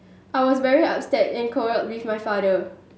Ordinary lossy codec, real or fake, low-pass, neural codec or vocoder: none; real; none; none